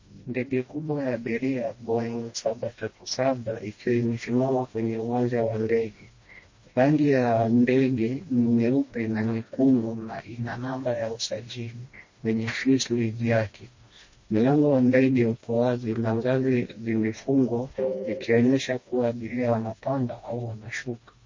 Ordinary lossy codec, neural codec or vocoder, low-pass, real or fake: MP3, 32 kbps; codec, 16 kHz, 1 kbps, FreqCodec, smaller model; 7.2 kHz; fake